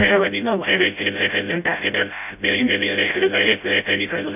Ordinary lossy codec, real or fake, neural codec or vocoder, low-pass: none; fake; codec, 16 kHz, 0.5 kbps, FreqCodec, smaller model; 3.6 kHz